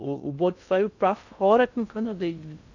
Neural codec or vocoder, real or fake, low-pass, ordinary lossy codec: codec, 16 kHz in and 24 kHz out, 0.6 kbps, FocalCodec, streaming, 2048 codes; fake; 7.2 kHz; none